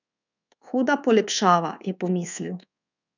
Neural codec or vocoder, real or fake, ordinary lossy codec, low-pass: codec, 24 kHz, 1.2 kbps, DualCodec; fake; none; 7.2 kHz